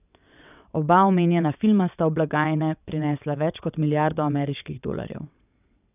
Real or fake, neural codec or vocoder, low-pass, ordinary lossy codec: fake; vocoder, 22.05 kHz, 80 mel bands, WaveNeXt; 3.6 kHz; none